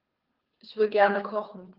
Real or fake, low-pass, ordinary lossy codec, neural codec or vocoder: fake; 5.4 kHz; Opus, 32 kbps; codec, 24 kHz, 3 kbps, HILCodec